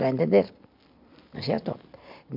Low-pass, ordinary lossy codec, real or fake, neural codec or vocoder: 5.4 kHz; MP3, 48 kbps; real; none